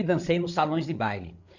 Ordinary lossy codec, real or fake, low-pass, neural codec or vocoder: none; fake; 7.2 kHz; codec, 16 kHz, 8 kbps, FreqCodec, larger model